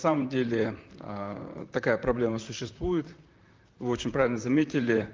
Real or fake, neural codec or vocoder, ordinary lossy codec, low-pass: fake; vocoder, 44.1 kHz, 128 mel bands, Pupu-Vocoder; Opus, 24 kbps; 7.2 kHz